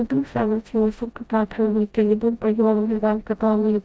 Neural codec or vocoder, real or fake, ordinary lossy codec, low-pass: codec, 16 kHz, 0.5 kbps, FreqCodec, smaller model; fake; none; none